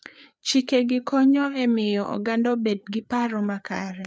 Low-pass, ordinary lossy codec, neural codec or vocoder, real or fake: none; none; codec, 16 kHz, 4 kbps, FreqCodec, larger model; fake